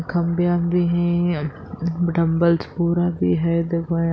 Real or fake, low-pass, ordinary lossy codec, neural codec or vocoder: real; none; none; none